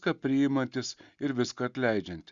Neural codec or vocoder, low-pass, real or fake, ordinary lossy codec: none; 7.2 kHz; real; Opus, 64 kbps